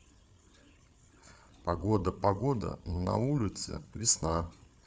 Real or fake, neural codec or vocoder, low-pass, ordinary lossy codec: fake; codec, 16 kHz, 8 kbps, FreqCodec, larger model; none; none